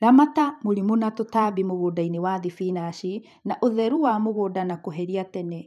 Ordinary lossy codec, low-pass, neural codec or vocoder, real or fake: none; 14.4 kHz; vocoder, 44.1 kHz, 128 mel bands every 512 samples, BigVGAN v2; fake